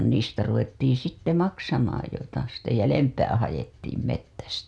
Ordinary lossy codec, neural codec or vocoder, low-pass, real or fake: none; none; none; real